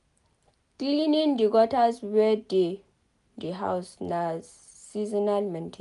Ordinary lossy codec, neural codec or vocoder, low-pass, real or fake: none; none; 10.8 kHz; real